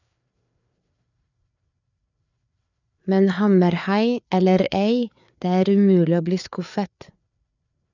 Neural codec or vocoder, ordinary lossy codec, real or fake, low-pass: codec, 16 kHz, 4 kbps, FreqCodec, larger model; none; fake; 7.2 kHz